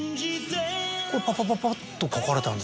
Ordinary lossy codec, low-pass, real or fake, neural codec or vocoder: none; none; real; none